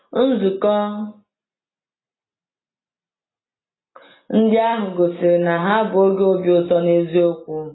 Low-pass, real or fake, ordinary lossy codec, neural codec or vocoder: 7.2 kHz; real; AAC, 16 kbps; none